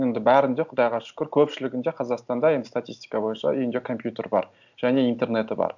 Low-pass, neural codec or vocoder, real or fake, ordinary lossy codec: 7.2 kHz; none; real; none